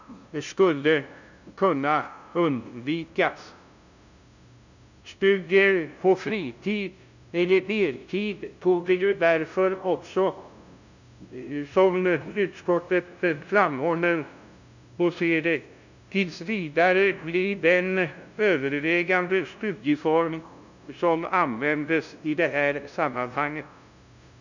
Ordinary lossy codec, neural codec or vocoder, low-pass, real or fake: none; codec, 16 kHz, 0.5 kbps, FunCodec, trained on LibriTTS, 25 frames a second; 7.2 kHz; fake